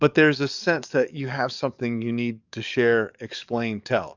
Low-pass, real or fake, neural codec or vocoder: 7.2 kHz; fake; codec, 44.1 kHz, 7.8 kbps, DAC